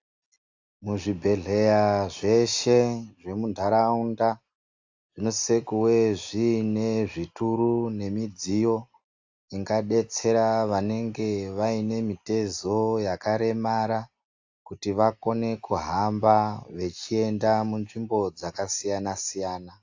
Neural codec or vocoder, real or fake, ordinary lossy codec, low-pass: none; real; AAC, 48 kbps; 7.2 kHz